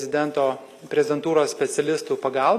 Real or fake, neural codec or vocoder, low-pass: real; none; 14.4 kHz